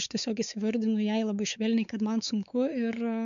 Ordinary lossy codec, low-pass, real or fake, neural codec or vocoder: MP3, 96 kbps; 7.2 kHz; fake; codec, 16 kHz, 4 kbps, X-Codec, WavLM features, trained on Multilingual LibriSpeech